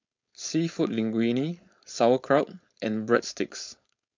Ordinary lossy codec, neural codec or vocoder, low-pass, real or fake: MP3, 64 kbps; codec, 16 kHz, 4.8 kbps, FACodec; 7.2 kHz; fake